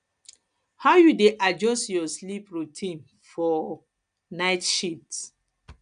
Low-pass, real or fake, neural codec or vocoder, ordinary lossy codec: 9.9 kHz; real; none; none